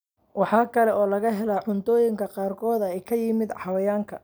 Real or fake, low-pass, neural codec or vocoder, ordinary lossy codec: real; none; none; none